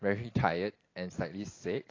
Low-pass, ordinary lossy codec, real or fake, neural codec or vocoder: 7.2 kHz; AAC, 32 kbps; real; none